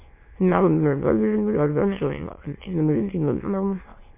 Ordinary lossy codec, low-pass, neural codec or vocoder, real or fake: MP3, 24 kbps; 3.6 kHz; autoencoder, 22.05 kHz, a latent of 192 numbers a frame, VITS, trained on many speakers; fake